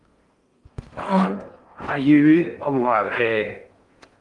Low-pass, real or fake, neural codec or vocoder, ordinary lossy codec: 10.8 kHz; fake; codec, 16 kHz in and 24 kHz out, 0.6 kbps, FocalCodec, streaming, 4096 codes; Opus, 32 kbps